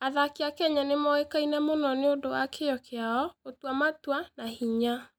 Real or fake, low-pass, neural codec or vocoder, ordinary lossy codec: real; 19.8 kHz; none; none